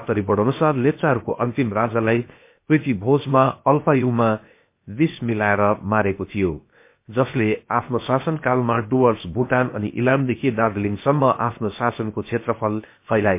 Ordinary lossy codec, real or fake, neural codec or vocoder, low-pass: MP3, 24 kbps; fake; codec, 16 kHz, 0.7 kbps, FocalCodec; 3.6 kHz